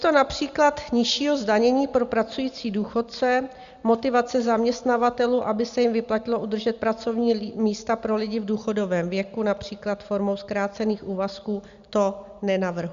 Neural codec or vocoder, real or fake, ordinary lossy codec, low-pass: none; real; Opus, 64 kbps; 7.2 kHz